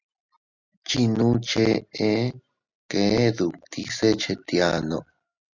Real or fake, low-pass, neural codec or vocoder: real; 7.2 kHz; none